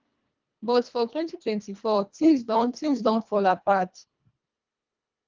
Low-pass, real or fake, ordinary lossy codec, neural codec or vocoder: 7.2 kHz; fake; Opus, 24 kbps; codec, 24 kHz, 1.5 kbps, HILCodec